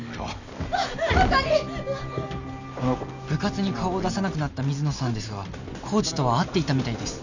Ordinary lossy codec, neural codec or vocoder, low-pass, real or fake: none; none; 7.2 kHz; real